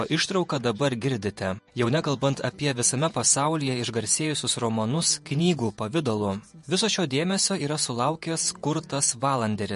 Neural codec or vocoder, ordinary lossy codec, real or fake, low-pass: vocoder, 48 kHz, 128 mel bands, Vocos; MP3, 48 kbps; fake; 14.4 kHz